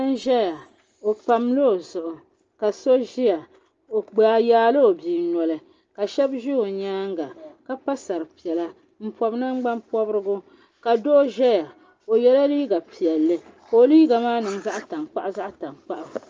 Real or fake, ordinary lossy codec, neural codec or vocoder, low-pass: real; Opus, 24 kbps; none; 7.2 kHz